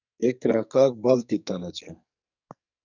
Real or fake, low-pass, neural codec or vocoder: fake; 7.2 kHz; codec, 44.1 kHz, 2.6 kbps, SNAC